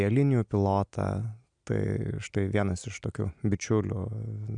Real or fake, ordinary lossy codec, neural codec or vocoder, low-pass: real; MP3, 96 kbps; none; 9.9 kHz